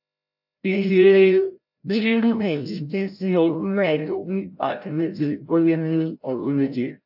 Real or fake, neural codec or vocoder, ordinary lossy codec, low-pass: fake; codec, 16 kHz, 0.5 kbps, FreqCodec, larger model; AAC, 48 kbps; 5.4 kHz